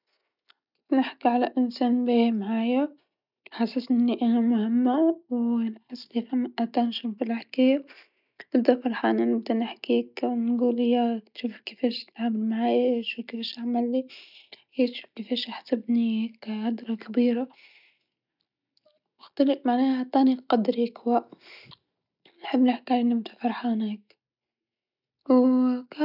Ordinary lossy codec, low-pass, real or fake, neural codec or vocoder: none; 5.4 kHz; real; none